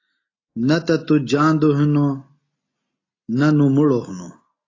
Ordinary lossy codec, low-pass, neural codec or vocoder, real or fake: AAC, 32 kbps; 7.2 kHz; none; real